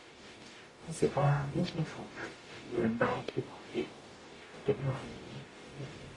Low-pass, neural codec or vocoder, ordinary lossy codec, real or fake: 10.8 kHz; codec, 44.1 kHz, 0.9 kbps, DAC; AAC, 32 kbps; fake